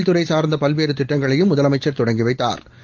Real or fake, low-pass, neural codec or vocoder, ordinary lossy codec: real; 7.2 kHz; none; Opus, 32 kbps